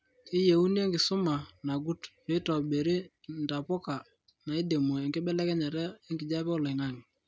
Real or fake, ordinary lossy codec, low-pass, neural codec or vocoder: real; none; none; none